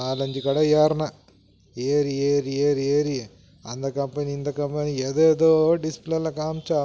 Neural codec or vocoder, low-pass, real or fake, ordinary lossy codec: none; none; real; none